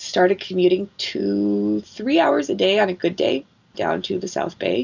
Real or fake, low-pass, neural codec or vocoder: real; 7.2 kHz; none